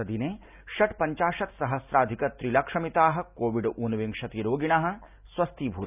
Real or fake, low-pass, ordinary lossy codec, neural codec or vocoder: real; 3.6 kHz; none; none